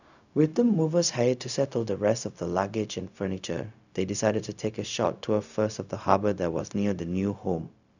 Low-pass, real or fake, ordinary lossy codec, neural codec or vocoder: 7.2 kHz; fake; none; codec, 16 kHz, 0.4 kbps, LongCat-Audio-Codec